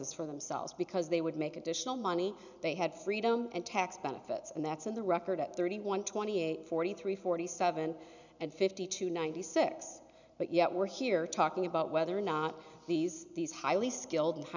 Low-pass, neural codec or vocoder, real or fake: 7.2 kHz; none; real